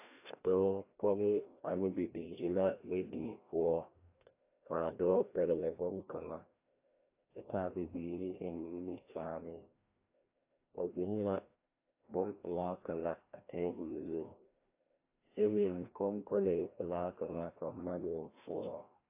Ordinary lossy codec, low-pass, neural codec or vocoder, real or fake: AAC, 24 kbps; 3.6 kHz; codec, 16 kHz, 1 kbps, FreqCodec, larger model; fake